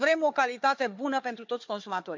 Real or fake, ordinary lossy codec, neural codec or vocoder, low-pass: fake; MP3, 64 kbps; codec, 16 kHz, 4 kbps, FunCodec, trained on Chinese and English, 50 frames a second; 7.2 kHz